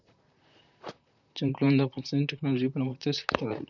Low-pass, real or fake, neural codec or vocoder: 7.2 kHz; fake; vocoder, 44.1 kHz, 128 mel bands, Pupu-Vocoder